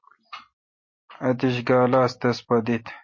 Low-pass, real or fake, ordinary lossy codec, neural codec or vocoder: 7.2 kHz; real; MP3, 32 kbps; none